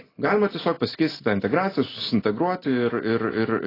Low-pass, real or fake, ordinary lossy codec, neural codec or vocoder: 5.4 kHz; real; AAC, 24 kbps; none